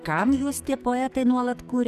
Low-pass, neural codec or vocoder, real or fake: 14.4 kHz; codec, 44.1 kHz, 2.6 kbps, SNAC; fake